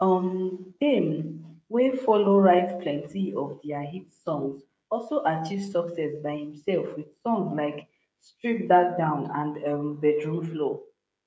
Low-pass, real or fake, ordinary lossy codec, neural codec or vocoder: none; fake; none; codec, 16 kHz, 16 kbps, FreqCodec, smaller model